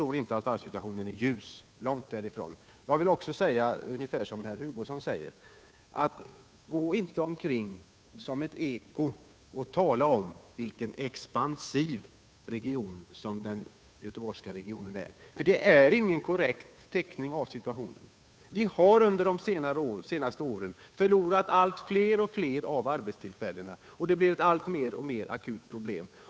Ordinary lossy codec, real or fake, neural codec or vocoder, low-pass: none; fake; codec, 16 kHz, 2 kbps, FunCodec, trained on Chinese and English, 25 frames a second; none